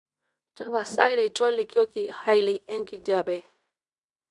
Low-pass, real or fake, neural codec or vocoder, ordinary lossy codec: 10.8 kHz; fake; codec, 16 kHz in and 24 kHz out, 0.9 kbps, LongCat-Audio-Codec, fine tuned four codebook decoder; none